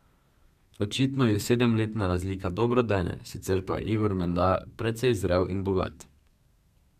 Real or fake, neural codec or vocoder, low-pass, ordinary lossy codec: fake; codec, 32 kHz, 1.9 kbps, SNAC; 14.4 kHz; none